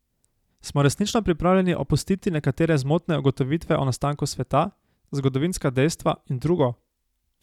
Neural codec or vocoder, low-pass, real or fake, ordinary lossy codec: none; 19.8 kHz; real; none